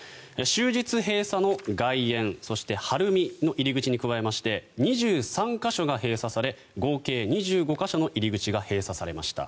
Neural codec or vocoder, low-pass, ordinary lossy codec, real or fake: none; none; none; real